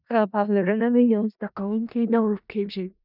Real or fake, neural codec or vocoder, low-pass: fake; codec, 16 kHz in and 24 kHz out, 0.4 kbps, LongCat-Audio-Codec, four codebook decoder; 5.4 kHz